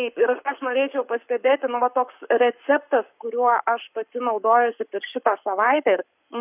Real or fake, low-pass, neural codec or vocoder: fake; 3.6 kHz; codec, 44.1 kHz, 7.8 kbps, Pupu-Codec